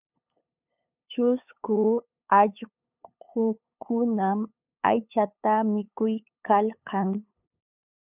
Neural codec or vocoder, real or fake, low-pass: codec, 16 kHz, 8 kbps, FunCodec, trained on LibriTTS, 25 frames a second; fake; 3.6 kHz